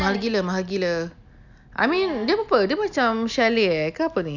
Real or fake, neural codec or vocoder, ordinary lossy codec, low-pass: real; none; none; 7.2 kHz